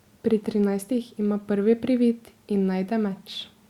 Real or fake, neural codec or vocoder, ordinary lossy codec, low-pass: real; none; Opus, 64 kbps; 19.8 kHz